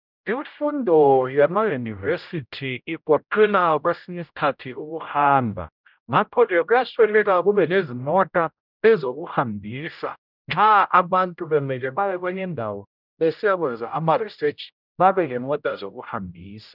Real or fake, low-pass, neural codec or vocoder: fake; 5.4 kHz; codec, 16 kHz, 0.5 kbps, X-Codec, HuBERT features, trained on general audio